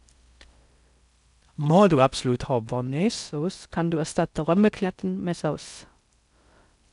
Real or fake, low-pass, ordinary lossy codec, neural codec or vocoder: fake; 10.8 kHz; none; codec, 16 kHz in and 24 kHz out, 0.6 kbps, FocalCodec, streaming, 4096 codes